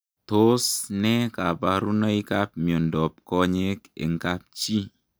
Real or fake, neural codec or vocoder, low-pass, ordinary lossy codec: real; none; none; none